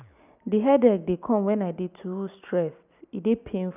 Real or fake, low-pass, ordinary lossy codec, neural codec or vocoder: real; 3.6 kHz; none; none